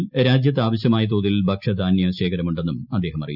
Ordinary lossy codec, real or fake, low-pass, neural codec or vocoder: none; real; 5.4 kHz; none